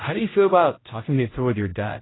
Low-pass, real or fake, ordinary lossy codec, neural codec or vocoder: 7.2 kHz; fake; AAC, 16 kbps; codec, 16 kHz, 0.5 kbps, X-Codec, HuBERT features, trained on general audio